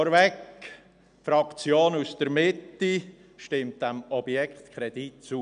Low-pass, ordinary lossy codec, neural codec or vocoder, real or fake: 9.9 kHz; none; none; real